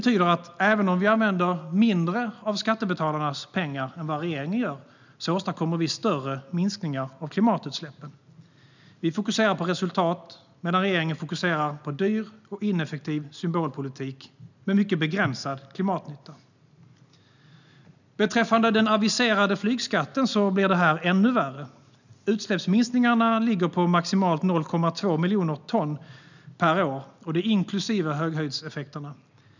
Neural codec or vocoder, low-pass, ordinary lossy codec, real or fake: none; 7.2 kHz; none; real